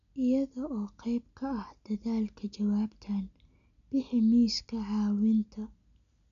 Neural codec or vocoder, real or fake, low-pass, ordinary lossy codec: none; real; 7.2 kHz; none